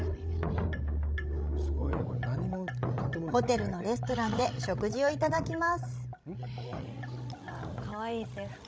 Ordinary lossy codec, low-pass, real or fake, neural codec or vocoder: none; none; fake; codec, 16 kHz, 16 kbps, FreqCodec, larger model